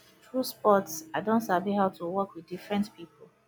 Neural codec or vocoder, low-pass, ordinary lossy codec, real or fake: none; none; none; real